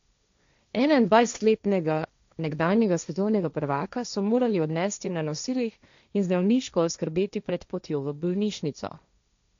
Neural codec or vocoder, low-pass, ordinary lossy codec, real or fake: codec, 16 kHz, 1.1 kbps, Voila-Tokenizer; 7.2 kHz; MP3, 64 kbps; fake